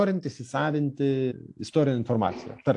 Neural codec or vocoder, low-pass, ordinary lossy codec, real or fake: none; 10.8 kHz; AAC, 64 kbps; real